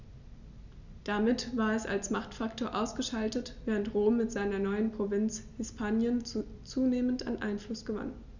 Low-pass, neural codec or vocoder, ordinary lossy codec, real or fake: 7.2 kHz; none; Opus, 64 kbps; real